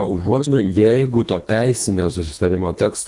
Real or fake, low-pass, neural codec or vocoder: fake; 10.8 kHz; codec, 24 kHz, 1.5 kbps, HILCodec